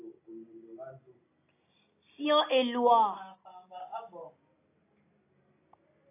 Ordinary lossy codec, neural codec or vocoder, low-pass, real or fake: AAC, 24 kbps; none; 3.6 kHz; real